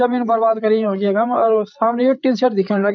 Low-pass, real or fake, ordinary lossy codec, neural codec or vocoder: 7.2 kHz; fake; none; codec, 16 kHz, 8 kbps, FreqCodec, larger model